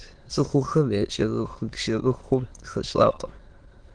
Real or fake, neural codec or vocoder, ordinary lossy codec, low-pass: fake; autoencoder, 22.05 kHz, a latent of 192 numbers a frame, VITS, trained on many speakers; Opus, 16 kbps; 9.9 kHz